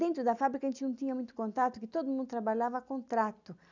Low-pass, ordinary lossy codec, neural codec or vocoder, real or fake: 7.2 kHz; none; none; real